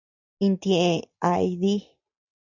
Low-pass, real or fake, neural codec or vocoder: 7.2 kHz; real; none